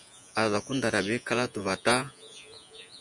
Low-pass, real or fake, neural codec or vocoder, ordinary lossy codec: 10.8 kHz; fake; vocoder, 48 kHz, 128 mel bands, Vocos; MP3, 96 kbps